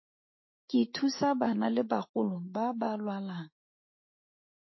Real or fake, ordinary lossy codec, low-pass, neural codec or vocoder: fake; MP3, 24 kbps; 7.2 kHz; autoencoder, 48 kHz, 128 numbers a frame, DAC-VAE, trained on Japanese speech